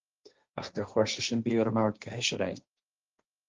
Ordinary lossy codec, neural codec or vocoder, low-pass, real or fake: Opus, 32 kbps; codec, 16 kHz, 1.1 kbps, Voila-Tokenizer; 7.2 kHz; fake